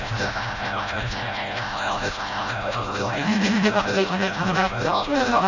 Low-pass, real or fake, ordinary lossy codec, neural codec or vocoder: 7.2 kHz; fake; none; codec, 16 kHz, 0.5 kbps, FreqCodec, smaller model